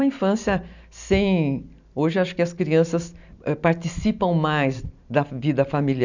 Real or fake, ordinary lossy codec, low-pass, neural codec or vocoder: real; none; 7.2 kHz; none